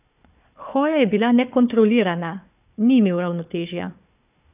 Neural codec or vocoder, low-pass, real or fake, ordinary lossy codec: codec, 16 kHz, 4 kbps, FunCodec, trained on Chinese and English, 50 frames a second; 3.6 kHz; fake; none